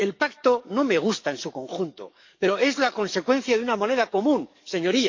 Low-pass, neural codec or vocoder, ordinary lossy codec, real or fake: 7.2 kHz; codec, 44.1 kHz, 7.8 kbps, DAC; MP3, 64 kbps; fake